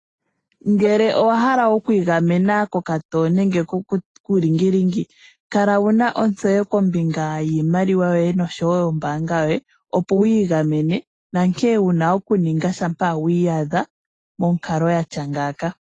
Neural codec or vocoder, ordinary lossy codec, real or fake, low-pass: none; AAC, 32 kbps; real; 10.8 kHz